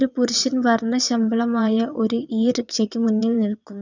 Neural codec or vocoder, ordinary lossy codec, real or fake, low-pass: vocoder, 22.05 kHz, 80 mel bands, WaveNeXt; none; fake; 7.2 kHz